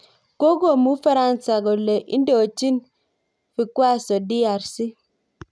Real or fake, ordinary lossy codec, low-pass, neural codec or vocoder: real; none; none; none